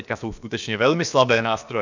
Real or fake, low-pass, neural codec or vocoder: fake; 7.2 kHz; codec, 16 kHz, about 1 kbps, DyCAST, with the encoder's durations